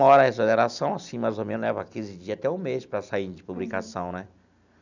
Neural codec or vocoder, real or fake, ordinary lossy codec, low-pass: none; real; none; 7.2 kHz